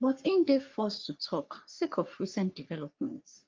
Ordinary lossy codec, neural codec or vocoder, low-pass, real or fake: Opus, 32 kbps; codec, 44.1 kHz, 3.4 kbps, Pupu-Codec; 7.2 kHz; fake